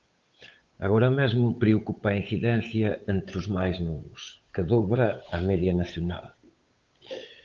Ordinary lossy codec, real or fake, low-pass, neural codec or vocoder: Opus, 24 kbps; fake; 7.2 kHz; codec, 16 kHz, 8 kbps, FunCodec, trained on Chinese and English, 25 frames a second